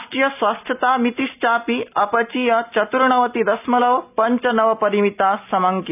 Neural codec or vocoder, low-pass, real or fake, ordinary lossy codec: none; 3.6 kHz; real; none